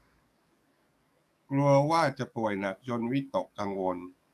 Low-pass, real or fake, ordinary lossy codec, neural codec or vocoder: 14.4 kHz; fake; AAC, 96 kbps; codec, 44.1 kHz, 7.8 kbps, DAC